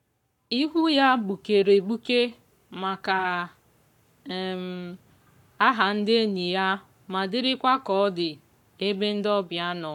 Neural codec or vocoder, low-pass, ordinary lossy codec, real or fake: codec, 44.1 kHz, 7.8 kbps, Pupu-Codec; 19.8 kHz; none; fake